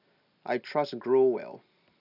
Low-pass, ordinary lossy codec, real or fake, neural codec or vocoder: 5.4 kHz; none; real; none